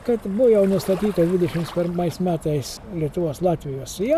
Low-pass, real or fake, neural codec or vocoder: 14.4 kHz; real; none